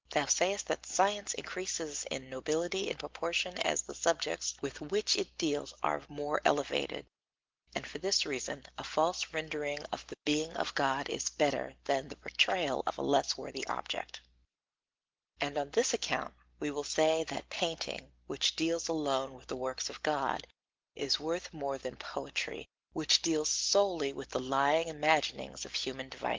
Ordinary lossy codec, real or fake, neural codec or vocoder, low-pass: Opus, 16 kbps; real; none; 7.2 kHz